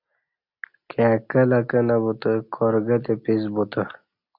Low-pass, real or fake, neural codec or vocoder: 5.4 kHz; real; none